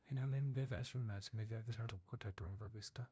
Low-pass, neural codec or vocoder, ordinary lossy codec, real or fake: none; codec, 16 kHz, 0.5 kbps, FunCodec, trained on LibriTTS, 25 frames a second; none; fake